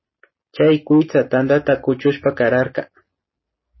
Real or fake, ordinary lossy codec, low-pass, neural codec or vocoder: real; MP3, 24 kbps; 7.2 kHz; none